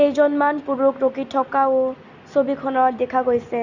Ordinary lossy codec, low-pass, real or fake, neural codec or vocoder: Opus, 64 kbps; 7.2 kHz; real; none